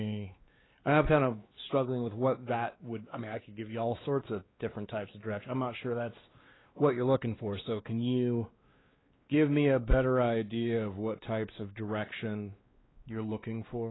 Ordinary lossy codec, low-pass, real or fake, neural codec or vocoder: AAC, 16 kbps; 7.2 kHz; fake; codec, 16 kHz, 2 kbps, X-Codec, WavLM features, trained on Multilingual LibriSpeech